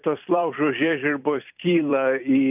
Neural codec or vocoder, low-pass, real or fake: vocoder, 44.1 kHz, 128 mel bands every 256 samples, BigVGAN v2; 3.6 kHz; fake